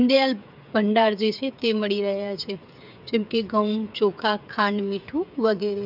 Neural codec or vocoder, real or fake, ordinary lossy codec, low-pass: codec, 16 kHz, 8 kbps, FreqCodec, smaller model; fake; none; 5.4 kHz